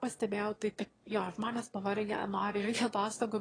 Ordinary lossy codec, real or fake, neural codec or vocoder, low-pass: AAC, 32 kbps; fake; autoencoder, 22.05 kHz, a latent of 192 numbers a frame, VITS, trained on one speaker; 9.9 kHz